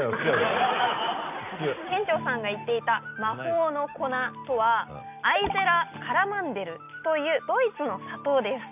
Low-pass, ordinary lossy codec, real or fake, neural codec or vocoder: 3.6 kHz; none; real; none